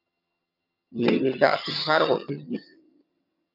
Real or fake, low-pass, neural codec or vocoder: fake; 5.4 kHz; vocoder, 22.05 kHz, 80 mel bands, HiFi-GAN